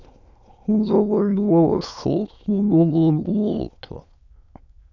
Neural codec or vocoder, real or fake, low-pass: autoencoder, 22.05 kHz, a latent of 192 numbers a frame, VITS, trained on many speakers; fake; 7.2 kHz